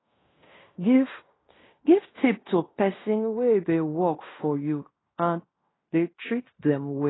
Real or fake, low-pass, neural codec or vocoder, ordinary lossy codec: fake; 7.2 kHz; codec, 16 kHz in and 24 kHz out, 0.9 kbps, LongCat-Audio-Codec, fine tuned four codebook decoder; AAC, 16 kbps